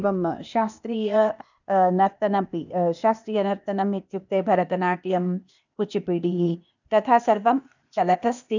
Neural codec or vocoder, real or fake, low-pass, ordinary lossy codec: codec, 16 kHz, 0.8 kbps, ZipCodec; fake; 7.2 kHz; none